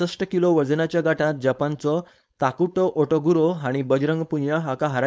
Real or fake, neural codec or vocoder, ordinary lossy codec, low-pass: fake; codec, 16 kHz, 4.8 kbps, FACodec; none; none